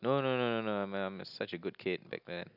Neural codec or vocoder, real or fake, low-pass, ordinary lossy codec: none; real; 5.4 kHz; none